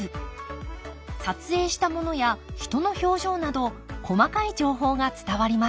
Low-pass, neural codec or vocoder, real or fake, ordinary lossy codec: none; none; real; none